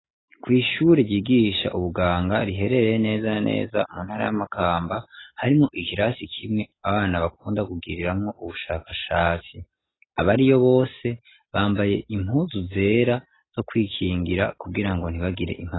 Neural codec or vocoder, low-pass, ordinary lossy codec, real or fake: none; 7.2 kHz; AAC, 16 kbps; real